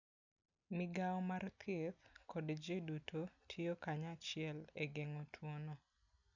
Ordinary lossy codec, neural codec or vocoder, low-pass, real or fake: none; none; 7.2 kHz; real